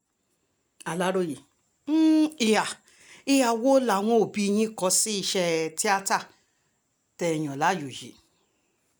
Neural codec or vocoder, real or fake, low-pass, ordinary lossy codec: none; real; none; none